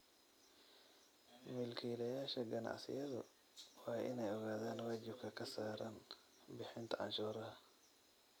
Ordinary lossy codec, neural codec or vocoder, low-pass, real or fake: none; none; none; real